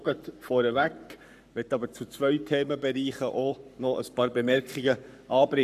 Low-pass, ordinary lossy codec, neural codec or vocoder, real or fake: 14.4 kHz; none; codec, 44.1 kHz, 7.8 kbps, Pupu-Codec; fake